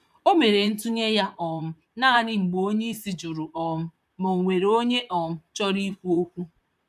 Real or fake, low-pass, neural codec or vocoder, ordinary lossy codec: fake; 14.4 kHz; vocoder, 44.1 kHz, 128 mel bands, Pupu-Vocoder; none